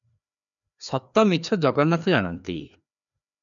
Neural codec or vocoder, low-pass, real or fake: codec, 16 kHz, 2 kbps, FreqCodec, larger model; 7.2 kHz; fake